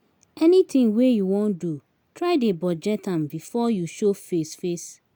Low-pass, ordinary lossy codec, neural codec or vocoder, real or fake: none; none; none; real